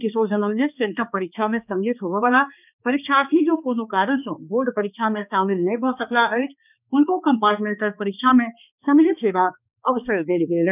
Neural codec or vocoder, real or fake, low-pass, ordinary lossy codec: codec, 16 kHz, 2 kbps, X-Codec, HuBERT features, trained on balanced general audio; fake; 3.6 kHz; none